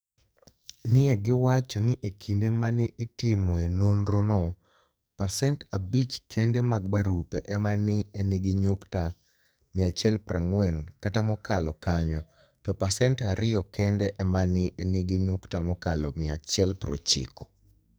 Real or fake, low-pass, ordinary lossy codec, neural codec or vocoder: fake; none; none; codec, 44.1 kHz, 2.6 kbps, SNAC